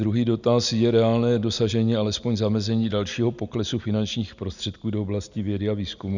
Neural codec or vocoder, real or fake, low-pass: none; real; 7.2 kHz